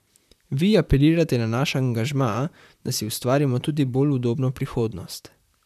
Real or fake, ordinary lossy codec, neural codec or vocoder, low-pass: fake; none; vocoder, 44.1 kHz, 128 mel bands, Pupu-Vocoder; 14.4 kHz